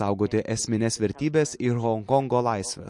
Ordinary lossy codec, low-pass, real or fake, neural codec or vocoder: MP3, 48 kbps; 10.8 kHz; real; none